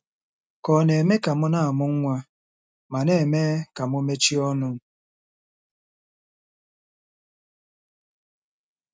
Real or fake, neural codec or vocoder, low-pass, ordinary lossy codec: real; none; none; none